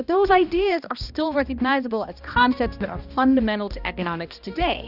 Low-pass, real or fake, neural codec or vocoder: 5.4 kHz; fake; codec, 16 kHz, 1 kbps, X-Codec, HuBERT features, trained on balanced general audio